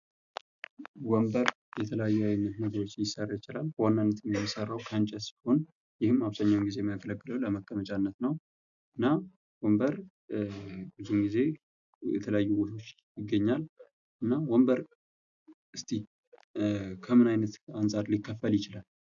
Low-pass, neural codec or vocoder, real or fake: 7.2 kHz; none; real